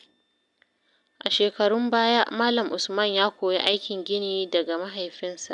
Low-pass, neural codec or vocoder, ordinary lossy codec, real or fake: 10.8 kHz; none; none; real